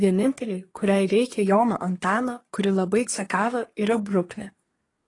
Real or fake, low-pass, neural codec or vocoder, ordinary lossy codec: fake; 10.8 kHz; codec, 24 kHz, 1 kbps, SNAC; AAC, 32 kbps